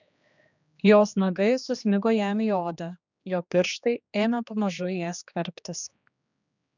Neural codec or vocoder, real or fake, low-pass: codec, 16 kHz, 2 kbps, X-Codec, HuBERT features, trained on general audio; fake; 7.2 kHz